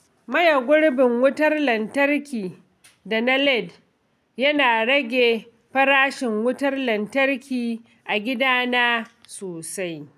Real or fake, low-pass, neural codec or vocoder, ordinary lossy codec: real; 14.4 kHz; none; none